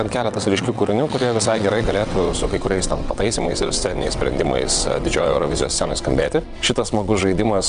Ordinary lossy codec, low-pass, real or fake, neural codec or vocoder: MP3, 96 kbps; 9.9 kHz; fake; vocoder, 22.05 kHz, 80 mel bands, WaveNeXt